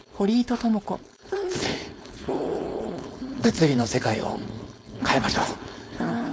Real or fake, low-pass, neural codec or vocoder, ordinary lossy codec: fake; none; codec, 16 kHz, 4.8 kbps, FACodec; none